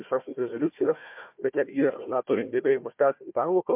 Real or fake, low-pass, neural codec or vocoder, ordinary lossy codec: fake; 3.6 kHz; codec, 16 kHz, 1 kbps, FunCodec, trained on Chinese and English, 50 frames a second; MP3, 32 kbps